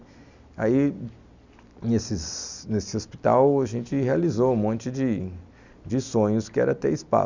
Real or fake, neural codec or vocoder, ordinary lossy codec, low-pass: real; none; Opus, 64 kbps; 7.2 kHz